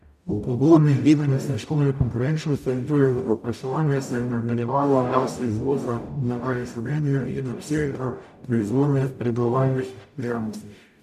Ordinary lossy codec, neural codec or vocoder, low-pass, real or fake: none; codec, 44.1 kHz, 0.9 kbps, DAC; 14.4 kHz; fake